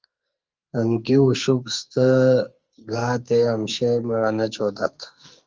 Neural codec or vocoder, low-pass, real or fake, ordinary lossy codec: codec, 44.1 kHz, 2.6 kbps, SNAC; 7.2 kHz; fake; Opus, 24 kbps